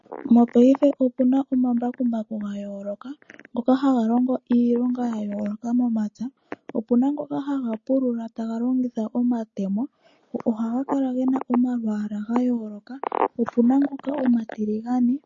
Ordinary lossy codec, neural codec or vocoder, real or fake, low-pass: MP3, 32 kbps; none; real; 7.2 kHz